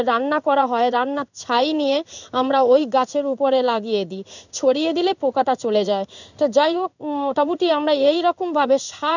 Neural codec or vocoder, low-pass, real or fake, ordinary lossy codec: codec, 16 kHz in and 24 kHz out, 1 kbps, XY-Tokenizer; 7.2 kHz; fake; none